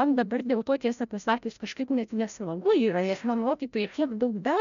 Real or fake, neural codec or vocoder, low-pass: fake; codec, 16 kHz, 0.5 kbps, FreqCodec, larger model; 7.2 kHz